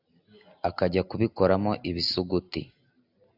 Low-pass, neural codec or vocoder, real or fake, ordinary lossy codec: 5.4 kHz; none; real; AAC, 48 kbps